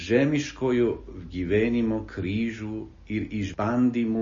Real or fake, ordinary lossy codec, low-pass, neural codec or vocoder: real; MP3, 32 kbps; 7.2 kHz; none